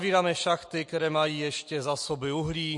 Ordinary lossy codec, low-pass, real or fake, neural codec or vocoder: MP3, 48 kbps; 10.8 kHz; real; none